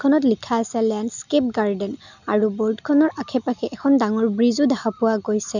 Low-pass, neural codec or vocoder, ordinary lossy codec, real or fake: 7.2 kHz; none; none; real